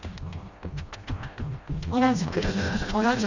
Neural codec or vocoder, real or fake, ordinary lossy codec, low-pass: codec, 16 kHz, 1 kbps, FreqCodec, smaller model; fake; Opus, 64 kbps; 7.2 kHz